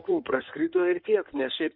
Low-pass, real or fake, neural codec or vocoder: 5.4 kHz; fake; codec, 16 kHz in and 24 kHz out, 2.2 kbps, FireRedTTS-2 codec